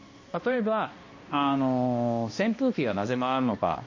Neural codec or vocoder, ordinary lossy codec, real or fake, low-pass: codec, 16 kHz, 1 kbps, X-Codec, HuBERT features, trained on balanced general audio; MP3, 32 kbps; fake; 7.2 kHz